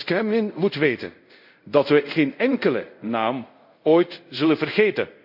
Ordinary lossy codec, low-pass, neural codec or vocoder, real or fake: none; 5.4 kHz; codec, 24 kHz, 0.5 kbps, DualCodec; fake